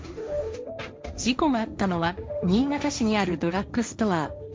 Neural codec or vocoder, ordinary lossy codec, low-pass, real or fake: codec, 16 kHz, 1.1 kbps, Voila-Tokenizer; none; none; fake